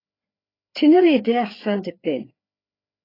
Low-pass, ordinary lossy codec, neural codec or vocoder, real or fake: 5.4 kHz; AAC, 24 kbps; codec, 16 kHz, 4 kbps, FreqCodec, larger model; fake